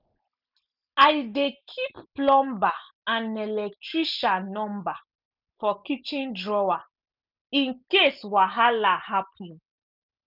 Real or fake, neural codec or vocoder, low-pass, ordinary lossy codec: real; none; 5.4 kHz; none